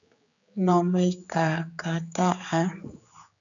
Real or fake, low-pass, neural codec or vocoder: fake; 7.2 kHz; codec, 16 kHz, 4 kbps, X-Codec, HuBERT features, trained on general audio